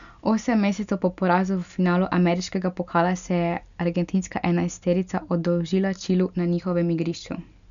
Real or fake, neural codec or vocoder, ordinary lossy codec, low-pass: real; none; none; 7.2 kHz